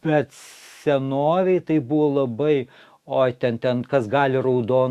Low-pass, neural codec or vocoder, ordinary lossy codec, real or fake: 14.4 kHz; autoencoder, 48 kHz, 128 numbers a frame, DAC-VAE, trained on Japanese speech; Opus, 64 kbps; fake